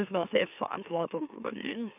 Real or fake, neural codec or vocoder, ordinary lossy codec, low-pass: fake; autoencoder, 44.1 kHz, a latent of 192 numbers a frame, MeloTTS; none; 3.6 kHz